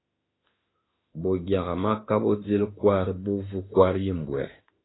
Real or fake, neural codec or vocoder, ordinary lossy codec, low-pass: fake; autoencoder, 48 kHz, 32 numbers a frame, DAC-VAE, trained on Japanese speech; AAC, 16 kbps; 7.2 kHz